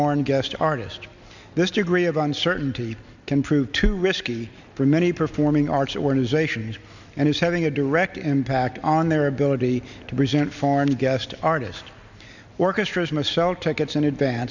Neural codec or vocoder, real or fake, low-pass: none; real; 7.2 kHz